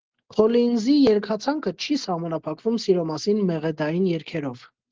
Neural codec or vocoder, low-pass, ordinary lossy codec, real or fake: none; 7.2 kHz; Opus, 16 kbps; real